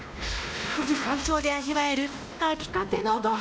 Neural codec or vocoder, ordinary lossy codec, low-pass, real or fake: codec, 16 kHz, 1 kbps, X-Codec, WavLM features, trained on Multilingual LibriSpeech; none; none; fake